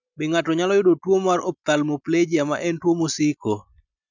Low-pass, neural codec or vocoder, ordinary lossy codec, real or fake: 7.2 kHz; none; none; real